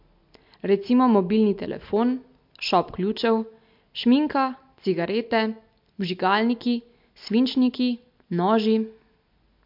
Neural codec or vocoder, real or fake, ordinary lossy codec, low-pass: none; real; MP3, 48 kbps; 5.4 kHz